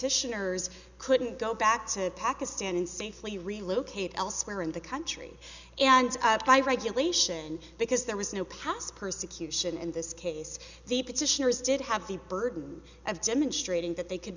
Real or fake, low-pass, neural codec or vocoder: real; 7.2 kHz; none